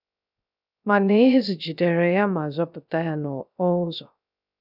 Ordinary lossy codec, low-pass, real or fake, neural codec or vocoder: none; 5.4 kHz; fake; codec, 16 kHz, 0.3 kbps, FocalCodec